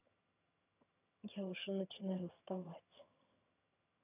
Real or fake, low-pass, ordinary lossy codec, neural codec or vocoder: fake; 3.6 kHz; none; vocoder, 22.05 kHz, 80 mel bands, HiFi-GAN